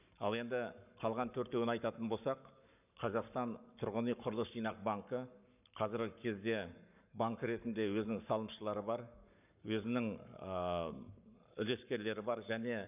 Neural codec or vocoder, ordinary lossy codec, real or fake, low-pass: codec, 44.1 kHz, 7.8 kbps, Pupu-Codec; none; fake; 3.6 kHz